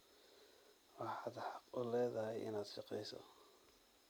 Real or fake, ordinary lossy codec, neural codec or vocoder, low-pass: real; none; none; none